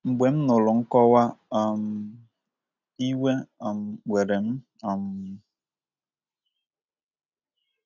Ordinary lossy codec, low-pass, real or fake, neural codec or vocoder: none; 7.2 kHz; real; none